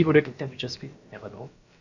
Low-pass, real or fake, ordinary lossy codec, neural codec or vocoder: 7.2 kHz; fake; none; codec, 16 kHz, about 1 kbps, DyCAST, with the encoder's durations